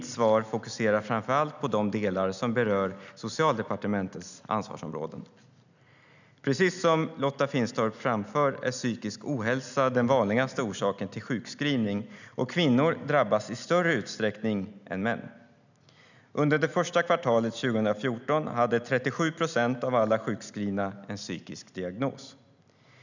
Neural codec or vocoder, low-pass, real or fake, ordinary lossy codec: none; 7.2 kHz; real; none